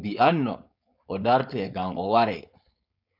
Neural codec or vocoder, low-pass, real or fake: codec, 16 kHz, 4.8 kbps, FACodec; 5.4 kHz; fake